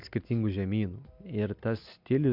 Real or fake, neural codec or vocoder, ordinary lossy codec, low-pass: real; none; MP3, 48 kbps; 5.4 kHz